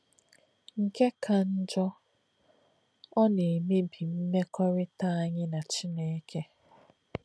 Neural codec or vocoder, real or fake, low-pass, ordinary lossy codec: none; real; none; none